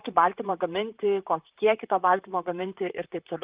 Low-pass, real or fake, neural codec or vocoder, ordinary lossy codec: 3.6 kHz; fake; vocoder, 44.1 kHz, 128 mel bands, Pupu-Vocoder; Opus, 32 kbps